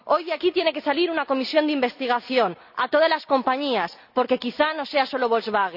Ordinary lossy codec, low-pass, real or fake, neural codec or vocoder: none; 5.4 kHz; real; none